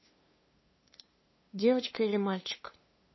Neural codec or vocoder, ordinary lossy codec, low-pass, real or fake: codec, 16 kHz, 2 kbps, FunCodec, trained on LibriTTS, 25 frames a second; MP3, 24 kbps; 7.2 kHz; fake